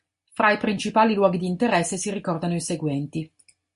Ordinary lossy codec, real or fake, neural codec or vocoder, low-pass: MP3, 48 kbps; real; none; 10.8 kHz